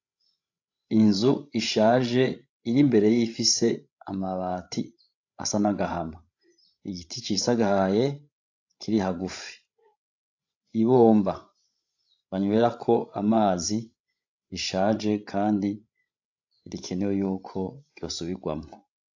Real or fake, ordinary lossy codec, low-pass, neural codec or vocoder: fake; AAC, 48 kbps; 7.2 kHz; codec, 16 kHz, 16 kbps, FreqCodec, larger model